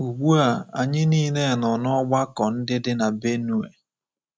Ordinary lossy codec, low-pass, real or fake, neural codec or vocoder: none; none; real; none